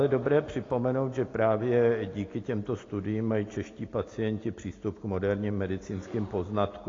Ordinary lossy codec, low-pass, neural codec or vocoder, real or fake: AAC, 32 kbps; 7.2 kHz; none; real